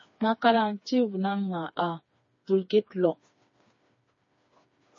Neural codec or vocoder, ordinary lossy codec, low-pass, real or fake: codec, 16 kHz, 4 kbps, FreqCodec, smaller model; MP3, 32 kbps; 7.2 kHz; fake